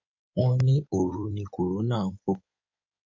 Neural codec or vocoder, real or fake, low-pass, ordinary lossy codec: codec, 16 kHz in and 24 kHz out, 2.2 kbps, FireRedTTS-2 codec; fake; 7.2 kHz; MP3, 48 kbps